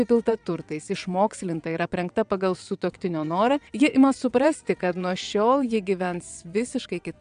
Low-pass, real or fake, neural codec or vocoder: 9.9 kHz; fake; vocoder, 22.05 kHz, 80 mel bands, WaveNeXt